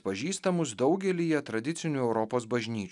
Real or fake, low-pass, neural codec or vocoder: real; 10.8 kHz; none